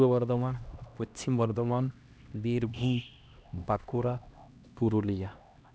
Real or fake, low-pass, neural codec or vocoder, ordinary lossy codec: fake; none; codec, 16 kHz, 1 kbps, X-Codec, HuBERT features, trained on LibriSpeech; none